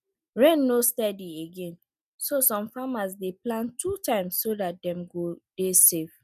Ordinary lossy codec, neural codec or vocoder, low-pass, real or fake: none; none; 14.4 kHz; real